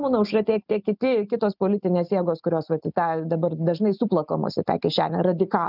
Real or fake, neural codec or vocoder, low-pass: real; none; 5.4 kHz